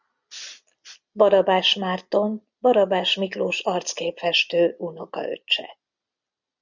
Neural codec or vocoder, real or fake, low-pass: none; real; 7.2 kHz